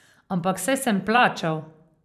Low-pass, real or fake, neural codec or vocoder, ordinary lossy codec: 14.4 kHz; fake; vocoder, 44.1 kHz, 128 mel bands every 512 samples, BigVGAN v2; none